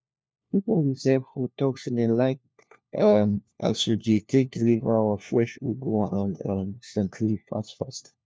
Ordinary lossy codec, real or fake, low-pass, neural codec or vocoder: none; fake; none; codec, 16 kHz, 1 kbps, FunCodec, trained on LibriTTS, 50 frames a second